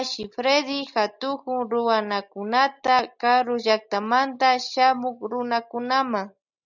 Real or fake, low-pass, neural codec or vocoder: real; 7.2 kHz; none